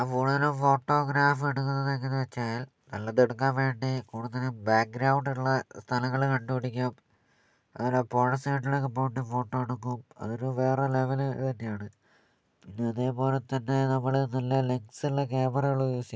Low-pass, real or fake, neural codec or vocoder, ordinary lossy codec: none; real; none; none